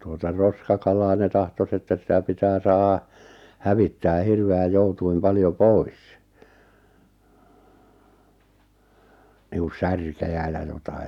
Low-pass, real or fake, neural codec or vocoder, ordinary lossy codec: 19.8 kHz; real; none; none